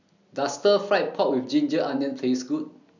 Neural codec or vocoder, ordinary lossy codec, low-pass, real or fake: none; none; 7.2 kHz; real